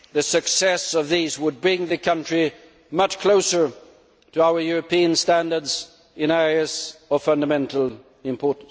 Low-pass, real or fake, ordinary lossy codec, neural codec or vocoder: none; real; none; none